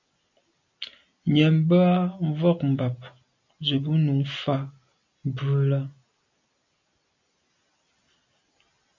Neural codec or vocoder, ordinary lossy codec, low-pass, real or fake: none; MP3, 64 kbps; 7.2 kHz; real